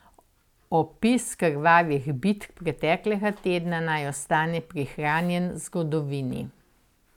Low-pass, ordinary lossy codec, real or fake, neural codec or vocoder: 19.8 kHz; none; real; none